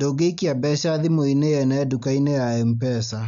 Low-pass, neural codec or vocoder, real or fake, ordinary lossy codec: 7.2 kHz; none; real; none